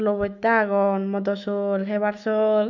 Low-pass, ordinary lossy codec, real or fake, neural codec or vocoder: 7.2 kHz; none; fake; autoencoder, 48 kHz, 128 numbers a frame, DAC-VAE, trained on Japanese speech